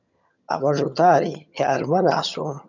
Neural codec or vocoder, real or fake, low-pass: vocoder, 22.05 kHz, 80 mel bands, HiFi-GAN; fake; 7.2 kHz